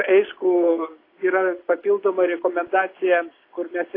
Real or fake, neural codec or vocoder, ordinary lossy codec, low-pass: real; none; AAC, 24 kbps; 5.4 kHz